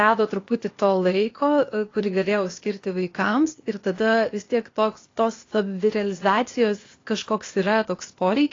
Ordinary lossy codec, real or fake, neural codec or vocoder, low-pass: AAC, 32 kbps; fake; codec, 16 kHz, 0.8 kbps, ZipCodec; 7.2 kHz